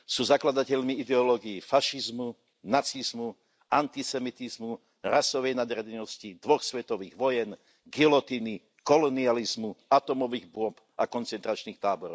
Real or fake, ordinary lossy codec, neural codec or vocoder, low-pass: real; none; none; none